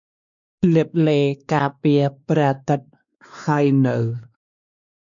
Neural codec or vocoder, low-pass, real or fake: codec, 16 kHz, 2 kbps, X-Codec, WavLM features, trained on Multilingual LibriSpeech; 7.2 kHz; fake